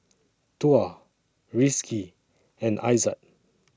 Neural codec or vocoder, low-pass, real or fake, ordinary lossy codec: none; none; real; none